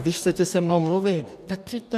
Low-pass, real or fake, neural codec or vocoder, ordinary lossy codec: 14.4 kHz; fake; codec, 44.1 kHz, 2.6 kbps, DAC; MP3, 96 kbps